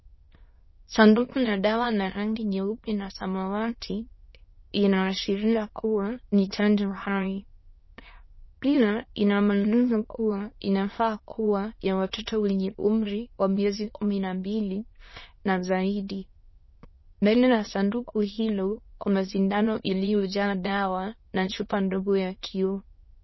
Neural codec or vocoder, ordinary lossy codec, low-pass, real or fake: autoencoder, 22.05 kHz, a latent of 192 numbers a frame, VITS, trained on many speakers; MP3, 24 kbps; 7.2 kHz; fake